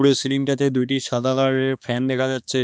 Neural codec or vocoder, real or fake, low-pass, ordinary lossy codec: codec, 16 kHz, 2 kbps, X-Codec, HuBERT features, trained on balanced general audio; fake; none; none